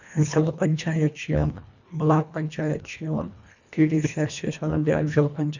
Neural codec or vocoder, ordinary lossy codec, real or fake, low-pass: codec, 24 kHz, 1.5 kbps, HILCodec; none; fake; 7.2 kHz